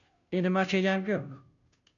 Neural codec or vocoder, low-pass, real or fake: codec, 16 kHz, 0.5 kbps, FunCodec, trained on Chinese and English, 25 frames a second; 7.2 kHz; fake